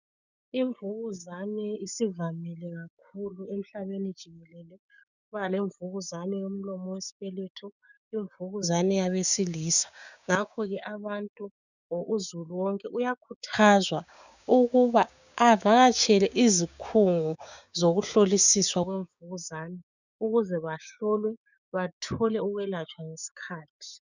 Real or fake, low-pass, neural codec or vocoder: fake; 7.2 kHz; codec, 16 kHz, 6 kbps, DAC